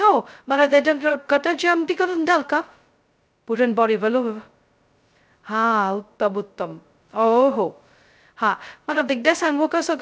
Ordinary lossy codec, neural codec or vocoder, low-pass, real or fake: none; codec, 16 kHz, 0.2 kbps, FocalCodec; none; fake